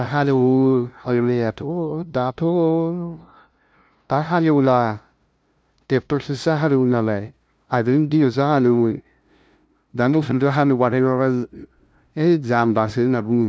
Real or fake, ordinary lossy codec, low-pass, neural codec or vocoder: fake; none; none; codec, 16 kHz, 0.5 kbps, FunCodec, trained on LibriTTS, 25 frames a second